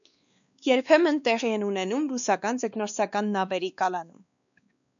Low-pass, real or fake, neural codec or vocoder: 7.2 kHz; fake; codec, 16 kHz, 2 kbps, X-Codec, WavLM features, trained on Multilingual LibriSpeech